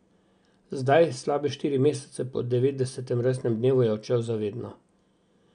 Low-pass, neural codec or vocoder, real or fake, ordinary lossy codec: 9.9 kHz; none; real; none